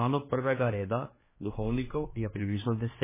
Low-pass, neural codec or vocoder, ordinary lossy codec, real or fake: 3.6 kHz; codec, 16 kHz, 2 kbps, X-Codec, HuBERT features, trained on general audio; MP3, 16 kbps; fake